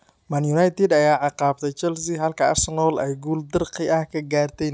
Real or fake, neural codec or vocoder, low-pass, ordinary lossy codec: real; none; none; none